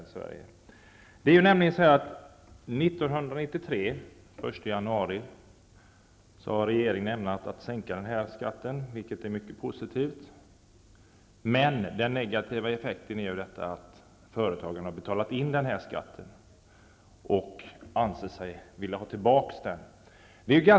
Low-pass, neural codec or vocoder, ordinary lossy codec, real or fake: none; none; none; real